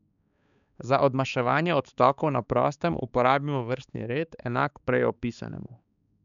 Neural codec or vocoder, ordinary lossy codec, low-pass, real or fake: codec, 16 kHz, 4 kbps, X-Codec, HuBERT features, trained on balanced general audio; none; 7.2 kHz; fake